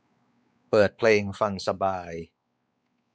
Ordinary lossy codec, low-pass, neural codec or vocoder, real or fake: none; none; codec, 16 kHz, 4 kbps, X-Codec, WavLM features, trained on Multilingual LibriSpeech; fake